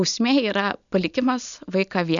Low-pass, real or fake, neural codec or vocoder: 7.2 kHz; real; none